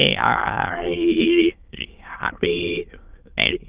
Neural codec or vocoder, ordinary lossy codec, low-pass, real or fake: autoencoder, 22.05 kHz, a latent of 192 numbers a frame, VITS, trained on many speakers; Opus, 32 kbps; 3.6 kHz; fake